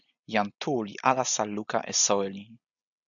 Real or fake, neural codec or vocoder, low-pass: real; none; 7.2 kHz